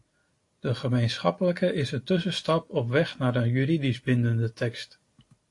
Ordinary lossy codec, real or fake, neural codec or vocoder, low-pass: AAC, 48 kbps; real; none; 10.8 kHz